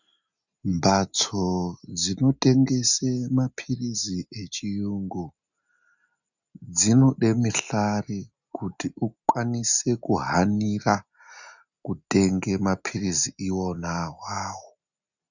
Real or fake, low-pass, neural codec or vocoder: real; 7.2 kHz; none